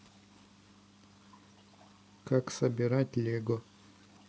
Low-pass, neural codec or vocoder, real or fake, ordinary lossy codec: none; none; real; none